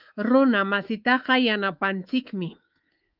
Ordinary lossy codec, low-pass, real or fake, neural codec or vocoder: Opus, 32 kbps; 5.4 kHz; fake; codec, 24 kHz, 3.1 kbps, DualCodec